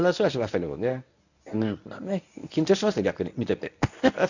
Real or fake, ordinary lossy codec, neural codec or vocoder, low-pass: fake; none; codec, 24 kHz, 0.9 kbps, WavTokenizer, medium speech release version 1; 7.2 kHz